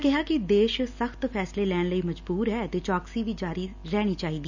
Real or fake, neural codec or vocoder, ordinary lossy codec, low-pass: real; none; none; 7.2 kHz